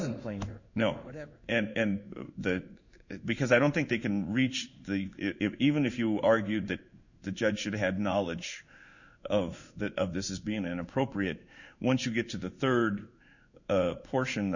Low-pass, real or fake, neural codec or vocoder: 7.2 kHz; fake; codec, 16 kHz in and 24 kHz out, 1 kbps, XY-Tokenizer